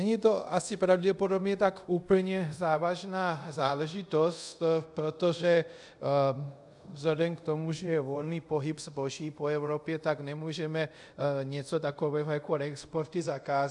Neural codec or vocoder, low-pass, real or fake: codec, 24 kHz, 0.5 kbps, DualCodec; 10.8 kHz; fake